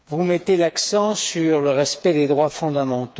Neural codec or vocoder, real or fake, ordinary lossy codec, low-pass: codec, 16 kHz, 4 kbps, FreqCodec, smaller model; fake; none; none